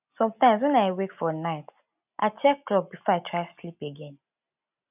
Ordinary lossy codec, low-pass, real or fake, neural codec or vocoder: none; 3.6 kHz; real; none